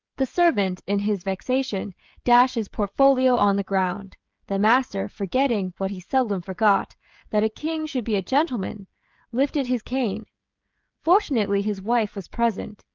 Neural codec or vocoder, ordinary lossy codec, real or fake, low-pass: codec, 16 kHz, 16 kbps, FreqCodec, smaller model; Opus, 24 kbps; fake; 7.2 kHz